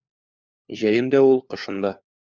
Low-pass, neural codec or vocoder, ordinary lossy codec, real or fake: 7.2 kHz; codec, 16 kHz, 4 kbps, FunCodec, trained on LibriTTS, 50 frames a second; Opus, 64 kbps; fake